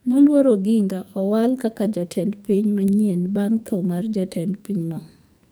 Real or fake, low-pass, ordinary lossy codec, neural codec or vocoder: fake; none; none; codec, 44.1 kHz, 2.6 kbps, SNAC